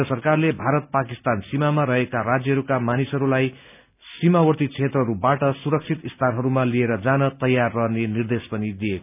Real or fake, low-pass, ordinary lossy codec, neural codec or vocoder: real; 3.6 kHz; none; none